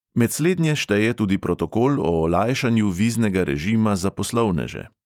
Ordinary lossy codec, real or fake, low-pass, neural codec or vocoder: none; fake; 19.8 kHz; vocoder, 48 kHz, 128 mel bands, Vocos